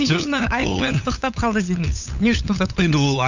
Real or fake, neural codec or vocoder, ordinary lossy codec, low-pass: fake; codec, 16 kHz, 8 kbps, FunCodec, trained on LibriTTS, 25 frames a second; none; 7.2 kHz